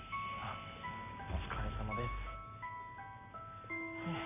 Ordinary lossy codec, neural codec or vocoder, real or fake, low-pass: none; none; real; 3.6 kHz